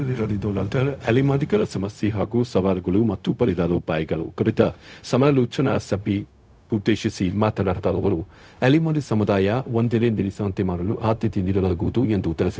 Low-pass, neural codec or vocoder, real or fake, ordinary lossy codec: none; codec, 16 kHz, 0.4 kbps, LongCat-Audio-Codec; fake; none